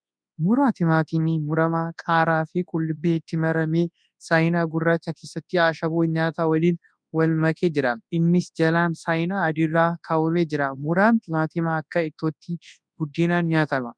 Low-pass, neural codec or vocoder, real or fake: 9.9 kHz; codec, 24 kHz, 0.9 kbps, WavTokenizer, large speech release; fake